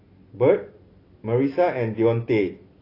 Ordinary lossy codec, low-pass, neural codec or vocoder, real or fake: AAC, 24 kbps; 5.4 kHz; none; real